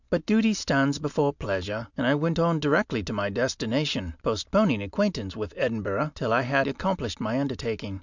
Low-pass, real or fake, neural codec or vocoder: 7.2 kHz; real; none